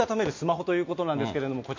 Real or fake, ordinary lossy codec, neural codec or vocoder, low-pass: real; MP3, 48 kbps; none; 7.2 kHz